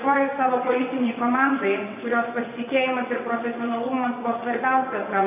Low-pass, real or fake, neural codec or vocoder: 3.6 kHz; fake; vocoder, 22.05 kHz, 80 mel bands, WaveNeXt